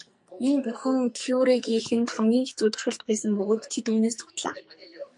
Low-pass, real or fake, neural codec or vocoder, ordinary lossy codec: 10.8 kHz; fake; codec, 44.1 kHz, 2.6 kbps, SNAC; MP3, 64 kbps